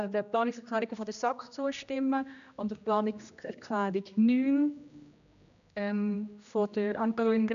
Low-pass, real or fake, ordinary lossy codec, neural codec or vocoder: 7.2 kHz; fake; none; codec, 16 kHz, 1 kbps, X-Codec, HuBERT features, trained on general audio